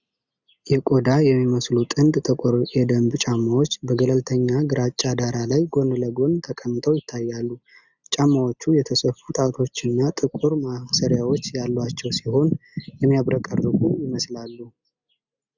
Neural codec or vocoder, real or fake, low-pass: none; real; 7.2 kHz